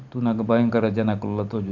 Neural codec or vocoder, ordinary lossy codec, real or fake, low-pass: none; none; real; 7.2 kHz